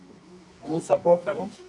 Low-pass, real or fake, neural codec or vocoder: 10.8 kHz; fake; codec, 24 kHz, 0.9 kbps, WavTokenizer, medium music audio release